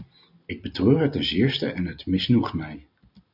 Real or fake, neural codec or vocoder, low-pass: real; none; 5.4 kHz